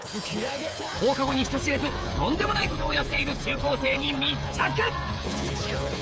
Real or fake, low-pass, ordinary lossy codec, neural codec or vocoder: fake; none; none; codec, 16 kHz, 8 kbps, FreqCodec, smaller model